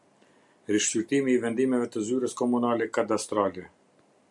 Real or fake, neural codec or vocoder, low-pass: real; none; 10.8 kHz